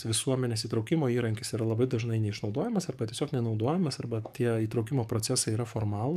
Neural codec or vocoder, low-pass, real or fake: codec, 44.1 kHz, 7.8 kbps, DAC; 14.4 kHz; fake